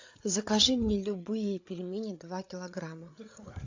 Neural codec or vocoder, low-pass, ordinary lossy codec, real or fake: codec, 16 kHz, 8 kbps, FreqCodec, larger model; 7.2 kHz; AAC, 48 kbps; fake